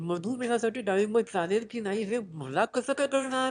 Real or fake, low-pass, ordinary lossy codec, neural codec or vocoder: fake; 9.9 kHz; none; autoencoder, 22.05 kHz, a latent of 192 numbers a frame, VITS, trained on one speaker